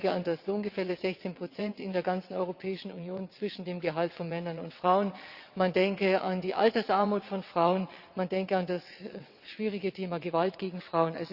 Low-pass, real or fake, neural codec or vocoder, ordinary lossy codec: 5.4 kHz; fake; vocoder, 22.05 kHz, 80 mel bands, WaveNeXt; Opus, 64 kbps